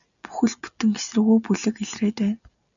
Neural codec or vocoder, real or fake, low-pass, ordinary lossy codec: none; real; 7.2 kHz; MP3, 96 kbps